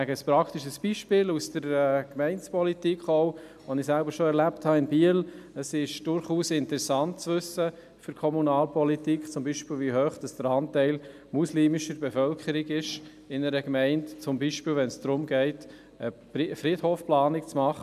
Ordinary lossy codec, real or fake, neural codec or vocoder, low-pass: none; real; none; 14.4 kHz